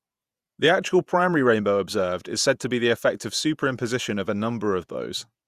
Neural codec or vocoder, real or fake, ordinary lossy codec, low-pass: vocoder, 48 kHz, 128 mel bands, Vocos; fake; Opus, 64 kbps; 14.4 kHz